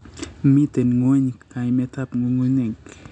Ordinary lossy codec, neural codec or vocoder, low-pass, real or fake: none; none; 9.9 kHz; real